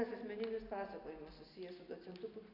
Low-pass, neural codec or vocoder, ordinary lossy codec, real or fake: 5.4 kHz; none; AAC, 48 kbps; real